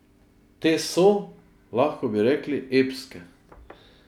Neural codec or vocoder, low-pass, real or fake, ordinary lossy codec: none; 19.8 kHz; real; none